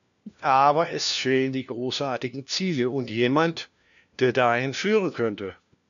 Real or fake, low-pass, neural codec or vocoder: fake; 7.2 kHz; codec, 16 kHz, 1 kbps, FunCodec, trained on LibriTTS, 50 frames a second